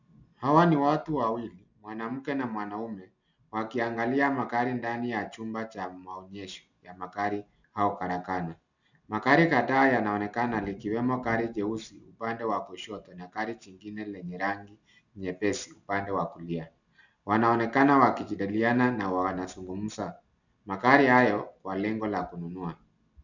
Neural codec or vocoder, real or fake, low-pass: none; real; 7.2 kHz